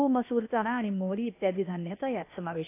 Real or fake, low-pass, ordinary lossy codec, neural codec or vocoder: fake; 3.6 kHz; AAC, 24 kbps; codec, 16 kHz, 0.8 kbps, ZipCodec